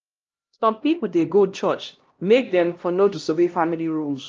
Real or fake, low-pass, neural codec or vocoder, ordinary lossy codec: fake; 7.2 kHz; codec, 16 kHz, 1 kbps, X-Codec, HuBERT features, trained on LibriSpeech; Opus, 24 kbps